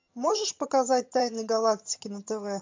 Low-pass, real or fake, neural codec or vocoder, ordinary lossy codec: 7.2 kHz; fake; vocoder, 22.05 kHz, 80 mel bands, HiFi-GAN; MP3, 64 kbps